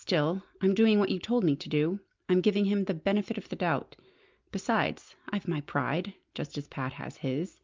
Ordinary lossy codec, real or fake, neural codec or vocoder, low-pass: Opus, 24 kbps; real; none; 7.2 kHz